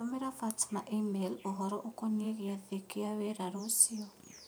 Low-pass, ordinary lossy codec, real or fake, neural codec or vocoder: none; none; fake; vocoder, 44.1 kHz, 128 mel bands every 512 samples, BigVGAN v2